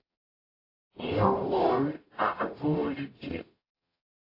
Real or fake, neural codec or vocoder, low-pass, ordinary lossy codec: fake; codec, 44.1 kHz, 0.9 kbps, DAC; 5.4 kHz; AAC, 32 kbps